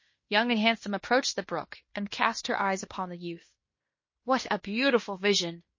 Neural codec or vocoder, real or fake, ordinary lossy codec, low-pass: codec, 16 kHz in and 24 kHz out, 0.9 kbps, LongCat-Audio-Codec, fine tuned four codebook decoder; fake; MP3, 32 kbps; 7.2 kHz